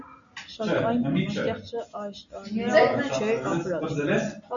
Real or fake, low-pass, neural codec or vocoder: real; 7.2 kHz; none